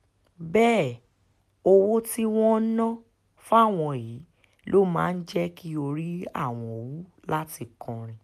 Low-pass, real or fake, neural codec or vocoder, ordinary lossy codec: 14.4 kHz; real; none; none